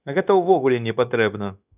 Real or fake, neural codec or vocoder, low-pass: fake; codec, 16 kHz, 0.9 kbps, LongCat-Audio-Codec; 3.6 kHz